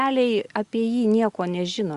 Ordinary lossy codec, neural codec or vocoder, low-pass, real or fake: Opus, 64 kbps; none; 10.8 kHz; real